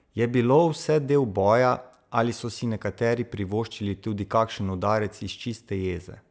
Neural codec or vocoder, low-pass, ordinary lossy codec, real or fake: none; none; none; real